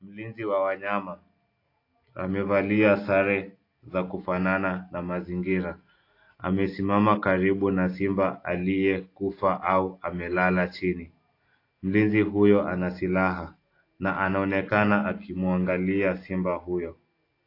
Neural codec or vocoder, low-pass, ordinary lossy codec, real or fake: none; 5.4 kHz; AAC, 32 kbps; real